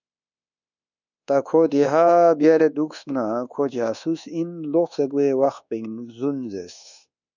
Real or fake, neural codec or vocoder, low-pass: fake; codec, 24 kHz, 1.2 kbps, DualCodec; 7.2 kHz